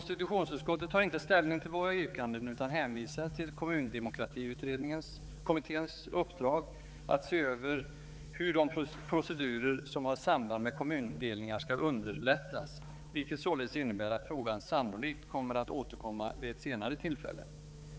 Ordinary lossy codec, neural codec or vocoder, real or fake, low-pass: none; codec, 16 kHz, 4 kbps, X-Codec, HuBERT features, trained on balanced general audio; fake; none